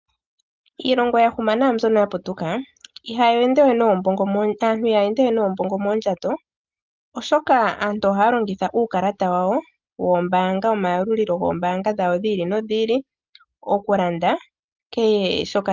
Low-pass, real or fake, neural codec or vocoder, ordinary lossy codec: 7.2 kHz; real; none; Opus, 24 kbps